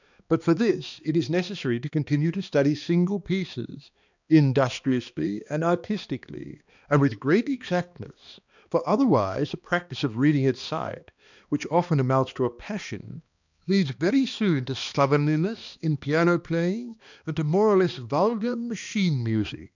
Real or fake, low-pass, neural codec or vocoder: fake; 7.2 kHz; codec, 16 kHz, 2 kbps, X-Codec, HuBERT features, trained on balanced general audio